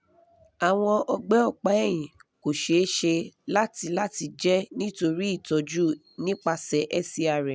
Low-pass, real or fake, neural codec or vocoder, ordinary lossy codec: none; real; none; none